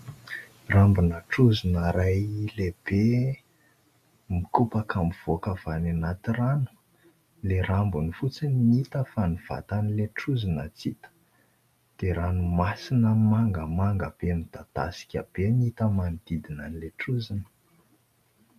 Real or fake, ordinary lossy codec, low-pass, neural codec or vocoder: real; MP3, 96 kbps; 14.4 kHz; none